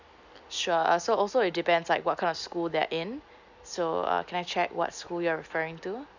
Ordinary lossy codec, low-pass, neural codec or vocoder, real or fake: none; 7.2 kHz; none; real